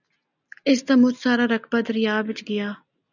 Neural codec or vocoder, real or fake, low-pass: none; real; 7.2 kHz